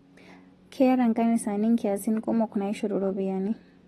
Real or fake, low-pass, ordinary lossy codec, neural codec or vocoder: real; 19.8 kHz; AAC, 32 kbps; none